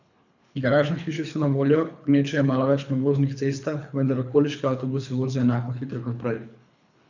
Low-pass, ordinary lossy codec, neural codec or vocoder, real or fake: 7.2 kHz; none; codec, 24 kHz, 3 kbps, HILCodec; fake